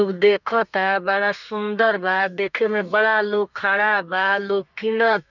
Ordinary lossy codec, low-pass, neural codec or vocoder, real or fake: none; 7.2 kHz; codec, 32 kHz, 1.9 kbps, SNAC; fake